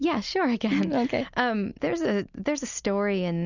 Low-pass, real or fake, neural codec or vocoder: 7.2 kHz; real; none